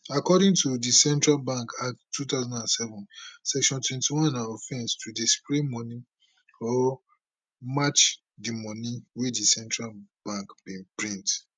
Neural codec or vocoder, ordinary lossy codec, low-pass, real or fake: none; none; none; real